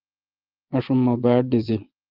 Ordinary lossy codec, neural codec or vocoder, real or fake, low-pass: Opus, 16 kbps; vocoder, 22.05 kHz, 80 mel bands, Vocos; fake; 5.4 kHz